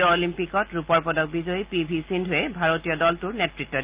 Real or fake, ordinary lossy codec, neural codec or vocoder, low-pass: real; Opus, 32 kbps; none; 3.6 kHz